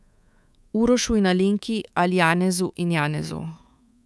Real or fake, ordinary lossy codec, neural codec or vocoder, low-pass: fake; none; codec, 24 kHz, 3.1 kbps, DualCodec; none